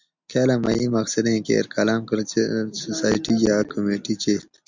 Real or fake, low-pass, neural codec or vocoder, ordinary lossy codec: real; 7.2 kHz; none; MP3, 64 kbps